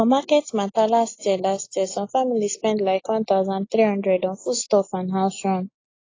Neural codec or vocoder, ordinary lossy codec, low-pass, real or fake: none; AAC, 32 kbps; 7.2 kHz; real